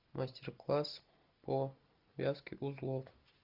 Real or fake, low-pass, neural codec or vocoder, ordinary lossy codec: real; 5.4 kHz; none; Opus, 64 kbps